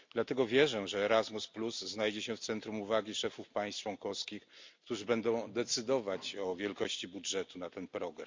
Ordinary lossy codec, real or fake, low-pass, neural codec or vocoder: MP3, 64 kbps; real; 7.2 kHz; none